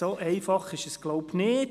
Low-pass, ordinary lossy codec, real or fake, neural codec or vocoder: 14.4 kHz; none; real; none